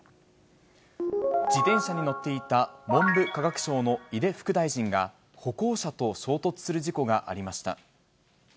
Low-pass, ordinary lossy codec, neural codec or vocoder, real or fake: none; none; none; real